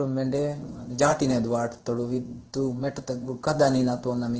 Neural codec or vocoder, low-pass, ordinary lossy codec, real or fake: codec, 16 kHz in and 24 kHz out, 1 kbps, XY-Tokenizer; 7.2 kHz; Opus, 16 kbps; fake